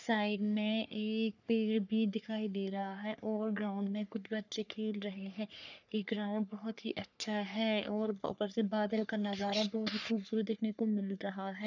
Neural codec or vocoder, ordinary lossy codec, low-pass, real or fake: codec, 44.1 kHz, 3.4 kbps, Pupu-Codec; none; 7.2 kHz; fake